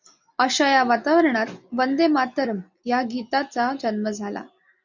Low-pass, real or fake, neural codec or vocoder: 7.2 kHz; real; none